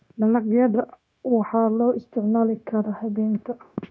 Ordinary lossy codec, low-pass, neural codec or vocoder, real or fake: none; none; codec, 16 kHz, 0.9 kbps, LongCat-Audio-Codec; fake